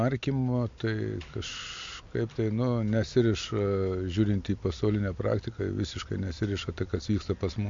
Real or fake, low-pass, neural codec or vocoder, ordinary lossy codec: real; 7.2 kHz; none; MP3, 48 kbps